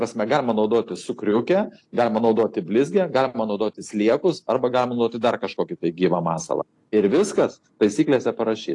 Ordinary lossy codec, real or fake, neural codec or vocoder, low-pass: AAC, 48 kbps; real; none; 10.8 kHz